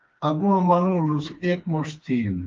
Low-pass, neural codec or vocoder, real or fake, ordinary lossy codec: 7.2 kHz; codec, 16 kHz, 2 kbps, FreqCodec, smaller model; fake; Opus, 24 kbps